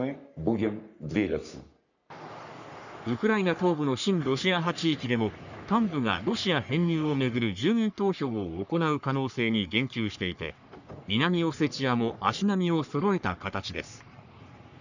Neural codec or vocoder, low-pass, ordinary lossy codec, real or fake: codec, 44.1 kHz, 3.4 kbps, Pupu-Codec; 7.2 kHz; none; fake